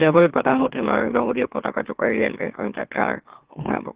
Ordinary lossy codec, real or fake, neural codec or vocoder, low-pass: Opus, 16 kbps; fake; autoencoder, 44.1 kHz, a latent of 192 numbers a frame, MeloTTS; 3.6 kHz